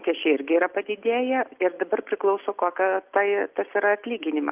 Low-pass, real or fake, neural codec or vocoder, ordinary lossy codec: 3.6 kHz; real; none; Opus, 32 kbps